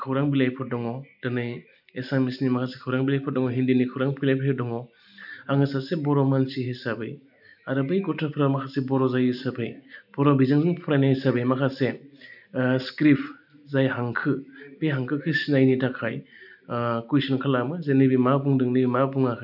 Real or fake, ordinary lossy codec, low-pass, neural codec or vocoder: real; none; 5.4 kHz; none